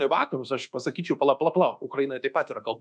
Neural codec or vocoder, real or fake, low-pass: codec, 24 kHz, 1.2 kbps, DualCodec; fake; 9.9 kHz